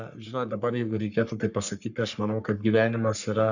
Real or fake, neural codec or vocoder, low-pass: fake; codec, 44.1 kHz, 3.4 kbps, Pupu-Codec; 7.2 kHz